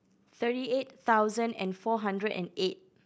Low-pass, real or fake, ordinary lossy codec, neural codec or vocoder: none; real; none; none